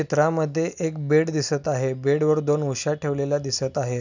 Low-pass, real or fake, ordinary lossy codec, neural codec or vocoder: 7.2 kHz; real; none; none